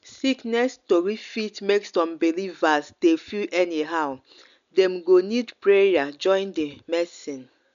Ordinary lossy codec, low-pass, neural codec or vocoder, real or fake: none; 7.2 kHz; none; real